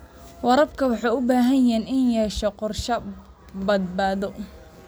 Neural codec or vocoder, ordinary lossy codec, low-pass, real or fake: none; none; none; real